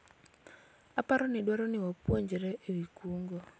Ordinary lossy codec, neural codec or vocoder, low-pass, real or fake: none; none; none; real